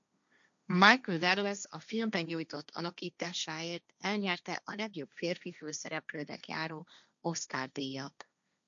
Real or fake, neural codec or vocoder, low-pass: fake; codec, 16 kHz, 1.1 kbps, Voila-Tokenizer; 7.2 kHz